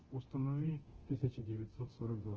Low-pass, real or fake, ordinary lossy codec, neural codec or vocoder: 7.2 kHz; fake; Opus, 16 kbps; codec, 24 kHz, 0.9 kbps, DualCodec